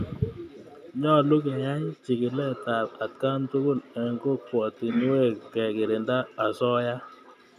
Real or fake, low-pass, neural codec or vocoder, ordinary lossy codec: real; 14.4 kHz; none; none